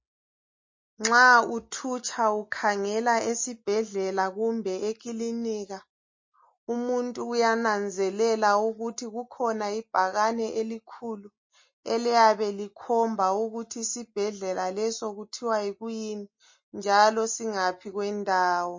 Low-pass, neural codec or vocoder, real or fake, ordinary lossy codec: 7.2 kHz; none; real; MP3, 32 kbps